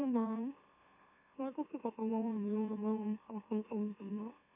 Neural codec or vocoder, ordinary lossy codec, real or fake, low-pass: autoencoder, 44.1 kHz, a latent of 192 numbers a frame, MeloTTS; none; fake; 3.6 kHz